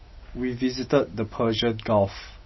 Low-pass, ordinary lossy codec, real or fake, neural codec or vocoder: 7.2 kHz; MP3, 24 kbps; real; none